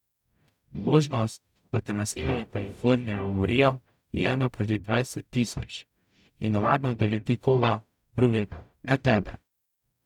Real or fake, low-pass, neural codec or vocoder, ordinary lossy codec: fake; 19.8 kHz; codec, 44.1 kHz, 0.9 kbps, DAC; none